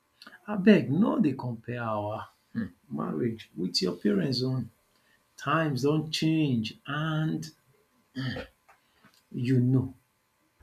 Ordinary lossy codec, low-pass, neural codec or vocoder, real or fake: none; 14.4 kHz; none; real